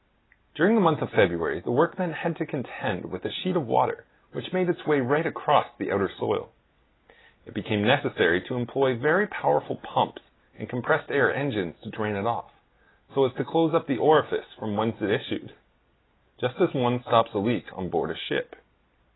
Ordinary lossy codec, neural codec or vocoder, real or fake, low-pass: AAC, 16 kbps; none; real; 7.2 kHz